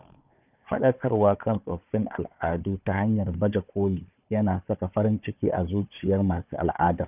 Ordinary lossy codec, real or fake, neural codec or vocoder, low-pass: none; fake; codec, 16 kHz, 4 kbps, FunCodec, trained on Chinese and English, 50 frames a second; 3.6 kHz